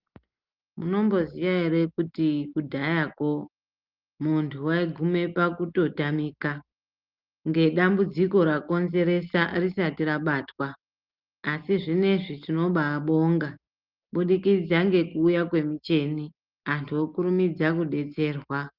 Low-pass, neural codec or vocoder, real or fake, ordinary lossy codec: 5.4 kHz; none; real; Opus, 24 kbps